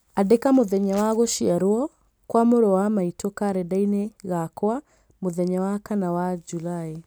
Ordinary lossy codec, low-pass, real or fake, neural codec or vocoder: none; none; real; none